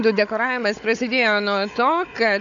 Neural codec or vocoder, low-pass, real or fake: codec, 16 kHz, 16 kbps, FunCodec, trained on Chinese and English, 50 frames a second; 7.2 kHz; fake